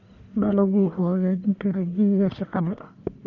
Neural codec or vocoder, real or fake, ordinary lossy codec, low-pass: codec, 44.1 kHz, 1.7 kbps, Pupu-Codec; fake; none; 7.2 kHz